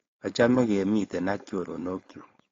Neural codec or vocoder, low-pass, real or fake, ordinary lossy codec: codec, 16 kHz, 4.8 kbps, FACodec; 7.2 kHz; fake; AAC, 32 kbps